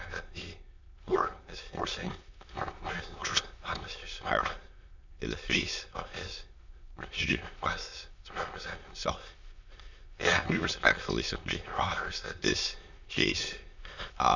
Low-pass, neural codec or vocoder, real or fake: 7.2 kHz; autoencoder, 22.05 kHz, a latent of 192 numbers a frame, VITS, trained on many speakers; fake